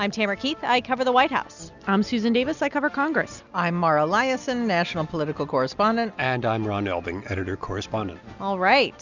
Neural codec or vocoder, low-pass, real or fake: none; 7.2 kHz; real